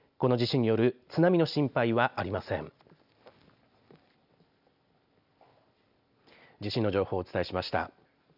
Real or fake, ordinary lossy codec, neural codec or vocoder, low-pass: real; none; none; 5.4 kHz